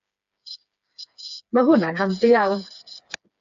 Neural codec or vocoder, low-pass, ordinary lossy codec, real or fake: codec, 16 kHz, 4 kbps, FreqCodec, smaller model; 7.2 kHz; AAC, 64 kbps; fake